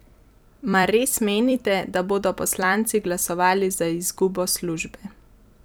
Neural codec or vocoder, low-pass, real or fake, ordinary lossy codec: vocoder, 44.1 kHz, 128 mel bands every 256 samples, BigVGAN v2; none; fake; none